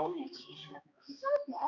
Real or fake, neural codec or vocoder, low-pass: fake; codec, 16 kHz, 1 kbps, X-Codec, HuBERT features, trained on balanced general audio; 7.2 kHz